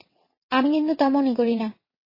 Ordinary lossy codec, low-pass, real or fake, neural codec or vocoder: MP3, 24 kbps; 5.4 kHz; fake; vocoder, 22.05 kHz, 80 mel bands, WaveNeXt